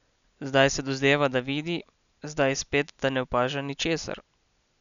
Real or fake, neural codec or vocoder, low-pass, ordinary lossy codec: real; none; 7.2 kHz; none